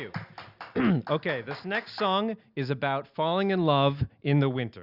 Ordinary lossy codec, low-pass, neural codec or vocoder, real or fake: Opus, 64 kbps; 5.4 kHz; none; real